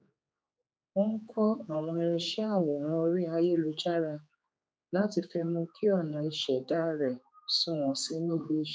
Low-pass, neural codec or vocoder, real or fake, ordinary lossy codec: none; codec, 16 kHz, 4 kbps, X-Codec, HuBERT features, trained on general audio; fake; none